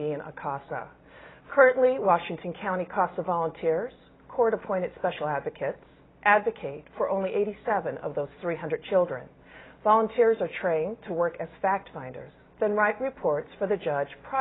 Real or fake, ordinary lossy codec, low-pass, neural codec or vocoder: real; AAC, 16 kbps; 7.2 kHz; none